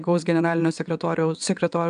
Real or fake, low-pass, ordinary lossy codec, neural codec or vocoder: fake; 9.9 kHz; AAC, 96 kbps; vocoder, 22.05 kHz, 80 mel bands, WaveNeXt